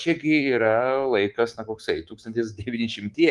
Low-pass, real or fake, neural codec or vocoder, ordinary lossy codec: 10.8 kHz; fake; codec, 24 kHz, 3.1 kbps, DualCodec; Opus, 24 kbps